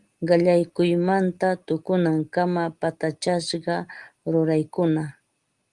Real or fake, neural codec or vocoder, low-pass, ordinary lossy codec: real; none; 10.8 kHz; Opus, 24 kbps